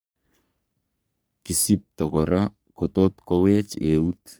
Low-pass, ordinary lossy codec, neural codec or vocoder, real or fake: none; none; codec, 44.1 kHz, 3.4 kbps, Pupu-Codec; fake